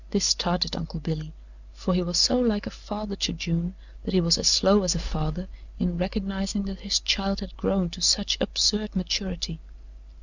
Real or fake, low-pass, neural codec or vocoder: real; 7.2 kHz; none